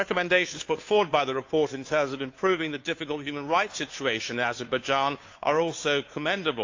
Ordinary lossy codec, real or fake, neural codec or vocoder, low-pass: AAC, 48 kbps; fake; codec, 16 kHz, 2 kbps, FunCodec, trained on Chinese and English, 25 frames a second; 7.2 kHz